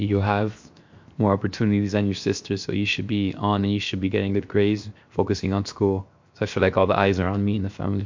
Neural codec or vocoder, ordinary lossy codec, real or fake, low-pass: codec, 16 kHz, 0.7 kbps, FocalCodec; MP3, 48 kbps; fake; 7.2 kHz